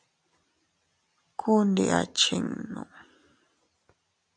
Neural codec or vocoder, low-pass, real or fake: none; 9.9 kHz; real